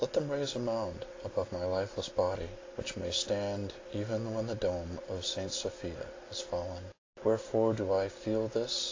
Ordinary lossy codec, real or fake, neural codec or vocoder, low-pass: AAC, 32 kbps; real; none; 7.2 kHz